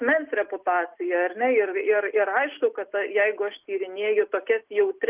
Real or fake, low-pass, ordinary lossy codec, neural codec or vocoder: real; 3.6 kHz; Opus, 32 kbps; none